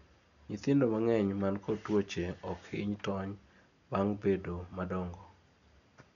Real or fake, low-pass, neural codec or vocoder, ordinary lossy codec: real; 7.2 kHz; none; none